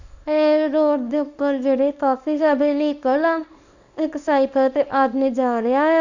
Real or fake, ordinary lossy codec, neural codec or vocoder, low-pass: fake; none; codec, 24 kHz, 0.9 kbps, WavTokenizer, small release; 7.2 kHz